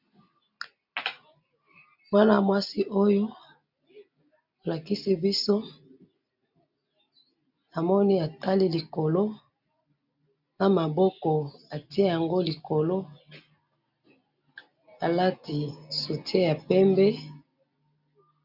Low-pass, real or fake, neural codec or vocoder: 5.4 kHz; real; none